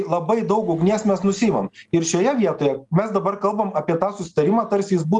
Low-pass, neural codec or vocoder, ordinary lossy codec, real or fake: 10.8 kHz; none; Opus, 32 kbps; real